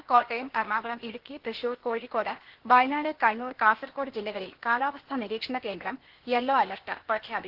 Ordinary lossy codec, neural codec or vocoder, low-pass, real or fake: Opus, 16 kbps; codec, 16 kHz, 0.8 kbps, ZipCodec; 5.4 kHz; fake